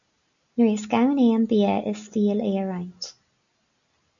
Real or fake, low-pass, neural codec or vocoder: real; 7.2 kHz; none